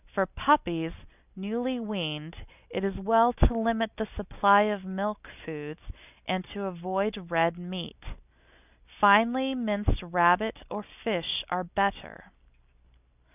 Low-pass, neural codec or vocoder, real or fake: 3.6 kHz; none; real